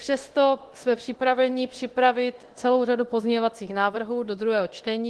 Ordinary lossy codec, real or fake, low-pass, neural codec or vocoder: Opus, 16 kbps; fake; 10.8 kHz; codec, 24 kHz, 0.9 kbps, DualCodec